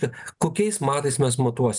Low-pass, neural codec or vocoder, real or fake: 10.8 kHz; none; real